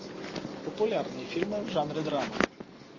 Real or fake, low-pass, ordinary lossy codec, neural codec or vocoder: real; 7.2 kHz; MP3, 32 kbps; none